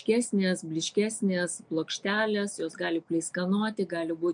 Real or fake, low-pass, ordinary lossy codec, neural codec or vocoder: real; 9.9 kHz; MP3, 64 kbps; none